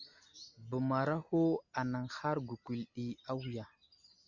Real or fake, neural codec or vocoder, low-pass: real; none; 7.2 kHz